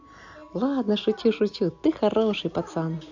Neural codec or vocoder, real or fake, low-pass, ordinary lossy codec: none; real; 7.2 kHz; AAC, 48 kbps